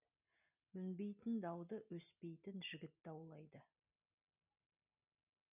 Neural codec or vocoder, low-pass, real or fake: none; 3.6 kHz; real